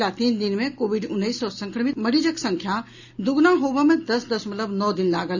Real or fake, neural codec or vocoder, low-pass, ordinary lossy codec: real; none; 7.2 kHz; none